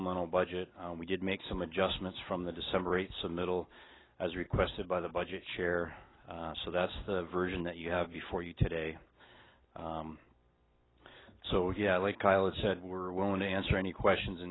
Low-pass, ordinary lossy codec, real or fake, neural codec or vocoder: 7.2 kHz; AAC, 16 kbps; real; none